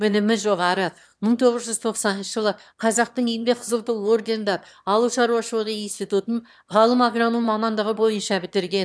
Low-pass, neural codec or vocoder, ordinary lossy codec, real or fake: none; autoencoder, 22.05 kHz, a latent of 192 numbers a frame, VITS, trained on one speaker; none; fake